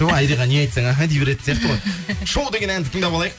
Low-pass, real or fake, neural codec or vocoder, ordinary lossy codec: none; real; none; none